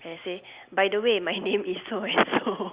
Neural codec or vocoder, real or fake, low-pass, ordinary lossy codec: none; real; 3.6 kHz; Opus, 64 kbps